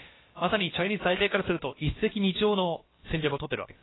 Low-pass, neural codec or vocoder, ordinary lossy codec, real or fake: 7.2 kHz; codec, 16 kHz, about 1 kbps, DyCAST, with the encoder's durations; AAC, 16 kbps; fake